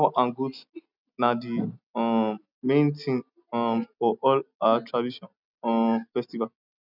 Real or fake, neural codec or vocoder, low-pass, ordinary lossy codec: real; none; 5.4 kHz; none